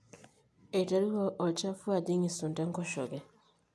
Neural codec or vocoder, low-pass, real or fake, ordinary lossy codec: none; none; real; none